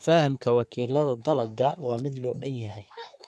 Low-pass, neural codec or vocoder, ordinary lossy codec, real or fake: none; codec, 24 kHz, 1 kbps, SNAC; none; fake